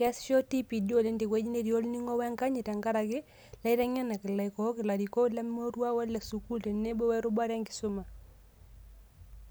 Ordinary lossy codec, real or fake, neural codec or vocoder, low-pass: none; real; none; none